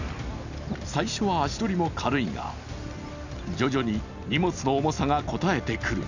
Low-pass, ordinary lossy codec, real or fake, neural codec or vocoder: 7.2 kHz; none; real; none